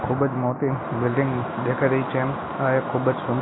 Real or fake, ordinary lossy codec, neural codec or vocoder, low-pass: real; AAC, 16 kbps; none; 7.2 kHz